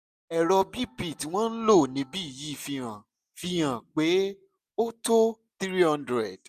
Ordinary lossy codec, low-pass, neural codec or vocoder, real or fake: none; 14.4 kHz; none; real